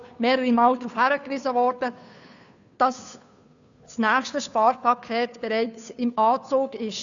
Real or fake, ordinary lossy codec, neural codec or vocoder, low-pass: fake; MP3, 96 kbps; codec, 16 kHz, 2 kbps, FunCodec, trained on Chinese and English, 25 frames a second; 7.2 kHz